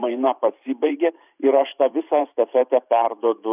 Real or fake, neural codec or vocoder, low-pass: fake; vocoder, 44.1 kHz, 128 mel bands every 512 samples, BigVGAN v2; 3.6 kHz